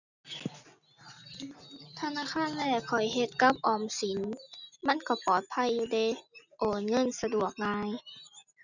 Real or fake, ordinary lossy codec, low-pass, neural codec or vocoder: real; none; 7.2 kHz; none